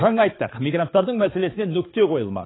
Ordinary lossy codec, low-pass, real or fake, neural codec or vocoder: AAC, 16 kbps; 7.2 kHz; fake; codec, 16 kHz, 4 kbps, X-Codec, HuBERT features, trained on balanced general audio